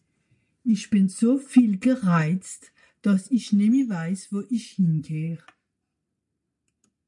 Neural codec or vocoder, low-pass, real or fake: none; 10.8 kHz; real